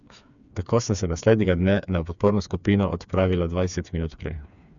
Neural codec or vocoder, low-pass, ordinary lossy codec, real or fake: codec, 16 kHz, 4 kbps, FreqCodec, smaller model; 7.2 kHz; none; fake